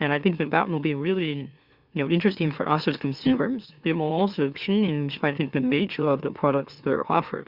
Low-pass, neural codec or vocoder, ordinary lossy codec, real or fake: 5.4 kHz; autoencoder, 44.1 kHz, a latent of 192 numbers a frame, MeloTTS; Opus, 64 kbps; fake